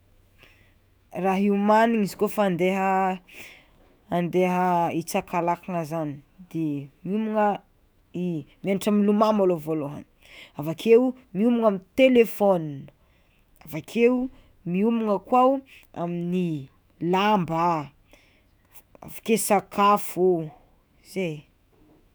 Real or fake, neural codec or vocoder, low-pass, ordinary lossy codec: fake; autoencoder, 48 kHz, 128 numbers a frame, DAC-VAE, trained on Japanese speech; none; none